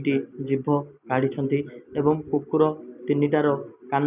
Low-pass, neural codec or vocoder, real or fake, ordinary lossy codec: 3.6 kHz; none; real; none